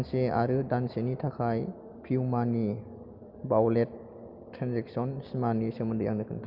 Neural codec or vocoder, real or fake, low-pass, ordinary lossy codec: none; real; 5.4 kHz; Opus, 24 kbps